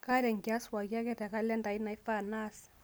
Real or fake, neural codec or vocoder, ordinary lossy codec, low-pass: real; none; none; none